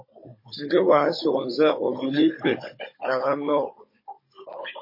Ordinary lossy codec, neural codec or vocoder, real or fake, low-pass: MP3, 24 kbps; codec, 16 kHz, 16 kbps, FunCodec, trained on Chinese and English, 50 frames a second; fake; 5.4 kHz